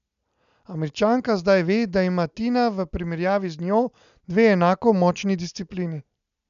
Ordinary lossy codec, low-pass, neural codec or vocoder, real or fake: none; 7.2 kHz; none; real